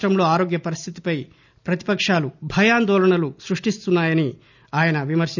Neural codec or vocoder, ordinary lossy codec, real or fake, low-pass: none; none; real; 7.2 kHz